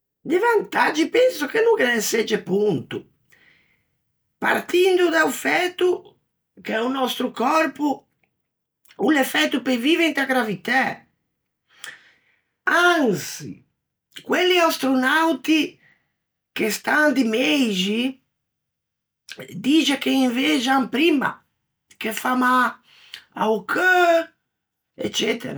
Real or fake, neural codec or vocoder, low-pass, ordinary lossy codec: real; none; none; none